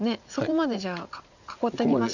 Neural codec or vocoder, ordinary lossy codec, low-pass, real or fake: vocoder, 44.1 kHz, 80 mel bands, Vocos; none; 7.2 kHz; fake